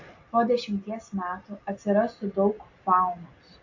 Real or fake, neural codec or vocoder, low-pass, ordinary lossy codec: real; none; 7.2 kHz; AAC, 48 kbps